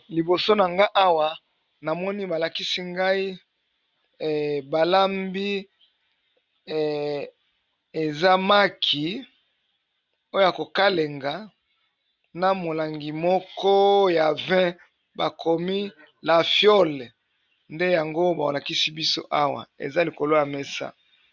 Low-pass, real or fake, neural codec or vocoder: 7.2 kHz; real; none